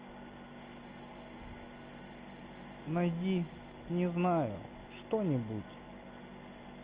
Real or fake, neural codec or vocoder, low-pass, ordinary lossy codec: real; none; 3.6 kHz; Opus, 24 kbps